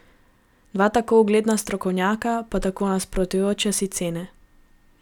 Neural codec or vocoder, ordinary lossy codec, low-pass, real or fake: none; none; 19.8 kHz; real